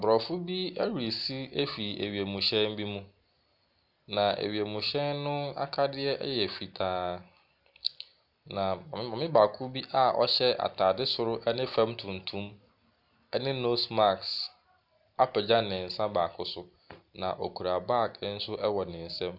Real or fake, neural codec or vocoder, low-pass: real; none; 5.4 kHz